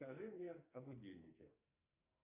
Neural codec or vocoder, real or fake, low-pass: codec, 44.1 kHz, 2.6 kbps, SNAC; fake; 3.6 kHz